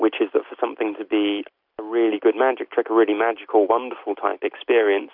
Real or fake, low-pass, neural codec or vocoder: real; 5.4 kHz; none